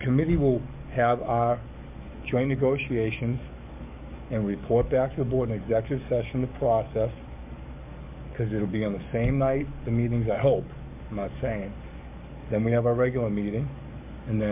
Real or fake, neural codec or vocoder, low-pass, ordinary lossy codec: fake; codec, 44.1 kHz, 7.8 kbps, DAC; 3.6 kHz; MP3, 32 kbps